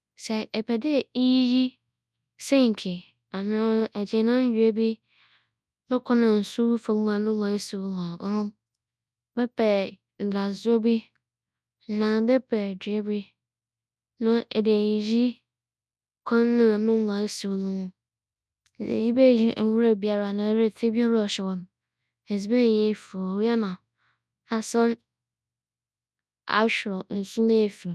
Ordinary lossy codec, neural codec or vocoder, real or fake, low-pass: none; codec, 24 kHz, 0.9 kbps, WavTokenizer, large speech release; fake; none